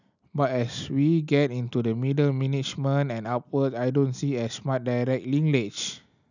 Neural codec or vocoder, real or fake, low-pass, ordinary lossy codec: none; real; 7.2 kHz; none